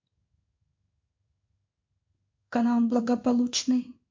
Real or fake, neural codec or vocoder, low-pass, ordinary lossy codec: fake; codec, 16 kHz in and 24 kHz out, 1 kbps, XY-Tokenizer; 7.2 kHz; MP3, 48 kbps